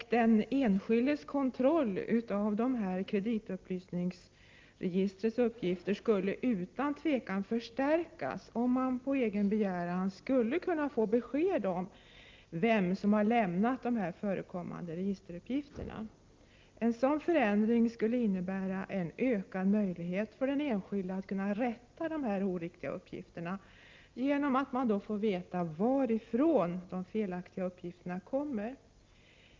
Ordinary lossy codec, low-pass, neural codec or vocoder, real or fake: Opus, 32 kbps; 7.2 kHz; none; real